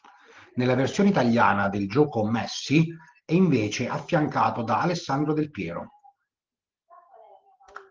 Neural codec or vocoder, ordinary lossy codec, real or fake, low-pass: none; Opus, 16 kbps; real; 7.2 kHz